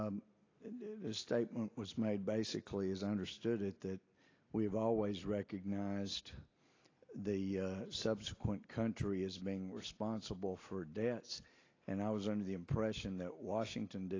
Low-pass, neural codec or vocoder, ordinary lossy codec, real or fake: 7.2 kHz; none; AAC, 32 kbps; real